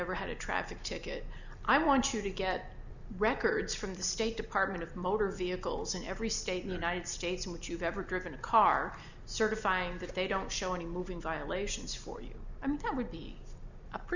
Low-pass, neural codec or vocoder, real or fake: 7.2 kHz; none; real